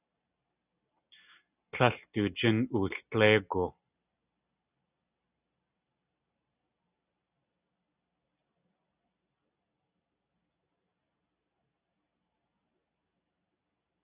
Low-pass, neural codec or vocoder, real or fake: 3.6 kHz; none; real